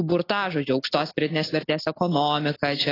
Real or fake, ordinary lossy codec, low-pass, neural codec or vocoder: real; AAC, 24 kbps; 5.4 kHz; none